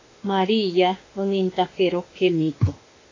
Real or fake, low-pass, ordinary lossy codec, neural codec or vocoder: fake; 7.2 kHz; AAC, 32 kbps; autoencoder, 48 kHz, 32 numbers a frame, DAC-VAE, trained on Japanese speech